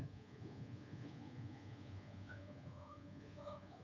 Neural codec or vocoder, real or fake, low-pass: codec, 24 kHz, 1.2 kbps, DualCodec; fake; 7.2 kHz